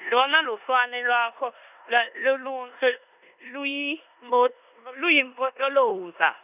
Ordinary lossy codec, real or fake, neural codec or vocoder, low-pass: none; fake; codec, 16 kHz in and 24 kHz out, 0.9 kbps, LongCat-Audio-Codec, four codebook decoder; 3.6 kHz